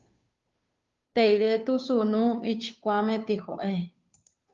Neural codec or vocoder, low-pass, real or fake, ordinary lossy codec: codec, 16 kHz, 2 kbps, FunCodec, trained on Chinese and English, 25 frames a second; 7.2 kHz; fake; Opus, 32 kbps